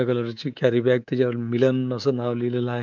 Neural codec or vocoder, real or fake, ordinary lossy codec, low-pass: codec, 24 kHz, 3.1 kbps, DualCodec; fake; none; 7.2 kHz